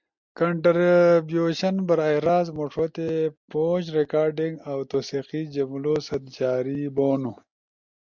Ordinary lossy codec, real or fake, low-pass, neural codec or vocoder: MP3, 64 kbps; real; 7.2 kHz; none